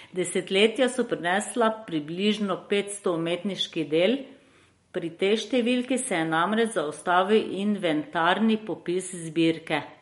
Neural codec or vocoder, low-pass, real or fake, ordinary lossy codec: none; 19.8 kHz; real; MP3, 48 kbps